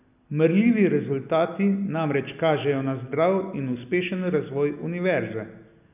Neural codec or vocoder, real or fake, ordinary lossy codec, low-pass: none; real; none; 3.6 kHz